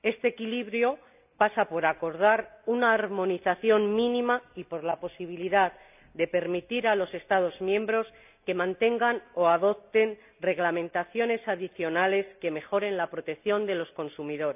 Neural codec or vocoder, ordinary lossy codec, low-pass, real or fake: none; none; 3.6 kHz; real